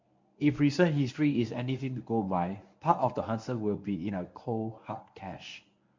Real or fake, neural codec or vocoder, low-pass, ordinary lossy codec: fake; codec, 24 kHz, 0.9 kbps, WavTokenizer, medium speech release version 1; 7.2 kHz; MP3, 64 kbps